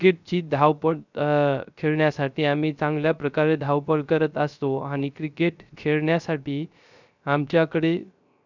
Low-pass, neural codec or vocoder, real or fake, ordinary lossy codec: 7.2 kHz; codec, 16 kHz, 0.3 kbps, FocalCodec; fake; none